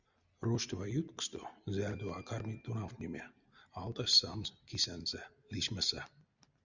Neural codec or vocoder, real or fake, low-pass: none; real; 7.2 kHz